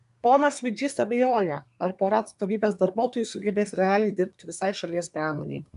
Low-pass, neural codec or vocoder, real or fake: 10.8 kHz; codec, 24 kHz, 1 kbps, SNAC; fake